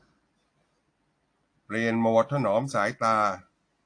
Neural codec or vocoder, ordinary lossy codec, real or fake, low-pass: none; AAC, 48 kbps; real; 9.9 kHz